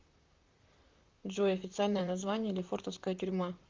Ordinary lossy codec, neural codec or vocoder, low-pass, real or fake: Opus, 24 kbps; vocoder, 44.1 kHz, 128 mel bands, Pupu-Vocoder; 7.2 kHz; fake